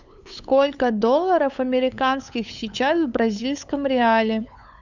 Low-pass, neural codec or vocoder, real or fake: 7.2 kHz; codec, 16 kHz, 8 kbps, FunCodec, trained on LibriTTS, 25 frames a second; fake